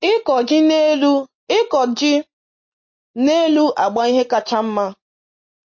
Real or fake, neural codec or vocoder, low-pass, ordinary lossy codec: real; none; 7.2 kHz; MP3, 32 kbps